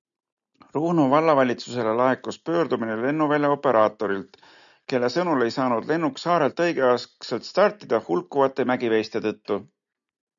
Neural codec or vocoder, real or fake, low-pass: none; real; 7.2 kHz